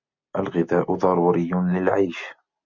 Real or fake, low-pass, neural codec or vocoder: real; 7.2 kHz; none